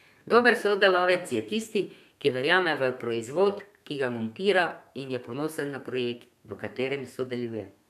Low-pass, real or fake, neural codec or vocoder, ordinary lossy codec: 14.4 kHz; fake; codec, 32 kHz, 1.9 kbps, SNAC; none